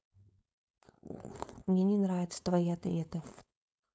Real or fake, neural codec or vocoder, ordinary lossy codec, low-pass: fake; codec, 16 kHz, 4.8 kbps, FACodec; none; none